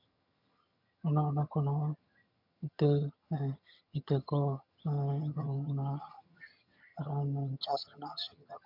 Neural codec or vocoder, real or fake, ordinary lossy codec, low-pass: vocoder, 22.05 kHz, 80 mel bands, HiFi-GAN; fake; MP3, 48 kbps; 5.4 kHz